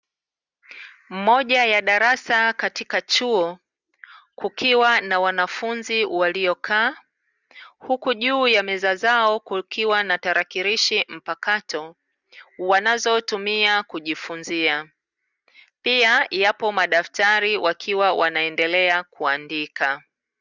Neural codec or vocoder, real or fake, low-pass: none; real; 7.2 kHz